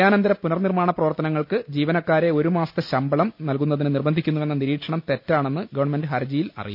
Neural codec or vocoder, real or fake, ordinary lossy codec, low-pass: none; real; none; 5.4 kHz